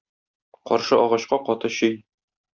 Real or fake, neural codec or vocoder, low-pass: real; none; 7.2 kHz